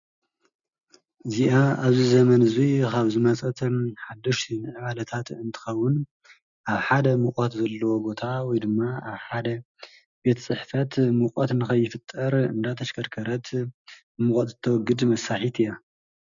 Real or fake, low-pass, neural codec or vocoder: real; 7.2 kHz; none